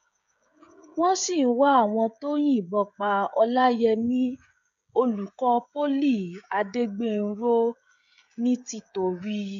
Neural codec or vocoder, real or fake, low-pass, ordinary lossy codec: codec, 16 kHz, 16 kbps, FreqCodec, smaller model; fake; 7.2 kHz; AAC, 96 kbps